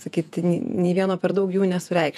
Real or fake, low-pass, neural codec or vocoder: fake; 14.4 kHz; vocoder, 48 kHz, 128 mel bands, Vocos